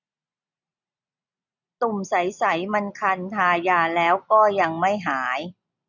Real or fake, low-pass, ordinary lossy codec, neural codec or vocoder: real; 7.2 kHz; none; none